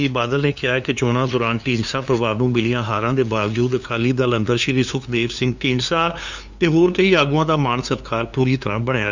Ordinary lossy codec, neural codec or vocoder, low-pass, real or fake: Opus, 64 kbps; codec, 16 kHz, 2 kbps, FunCodec, trained on LibriTTS, 25 frames a second; 7.2 kHz; fake